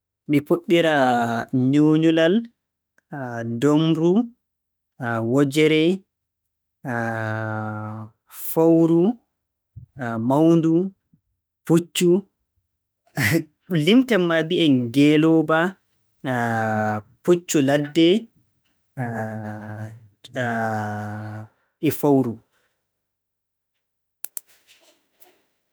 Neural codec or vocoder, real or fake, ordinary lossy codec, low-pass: autoencoder, 48 kHz, 32 numbers a frame, DAC-VAE, trained on Japanese speech; fake; none; none